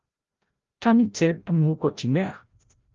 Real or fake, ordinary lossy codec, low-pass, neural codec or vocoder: fake; Opus, 32 kbps; 7.2 kHz; codec, 16 kHz, 0.5 kbps, FreqCodec, larger model